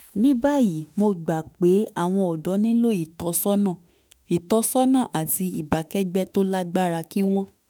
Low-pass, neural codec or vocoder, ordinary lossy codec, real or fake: none; autoencoder, 48 kHz, 32 numbers a frame, DAC-VAE, trained on Japanese speech; none; fake